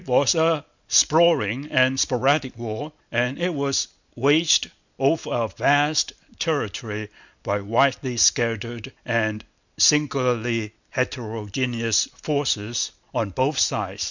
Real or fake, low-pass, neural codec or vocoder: real; 7.2 kHz; none